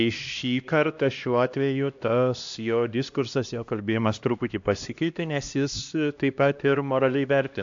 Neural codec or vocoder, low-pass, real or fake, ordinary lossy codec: codec, 16 kHz, 1 kbps, X-Codec, HuBERT features, trained on LibriSpeech; 7.2 kHz; fake; AAC, 64 kbps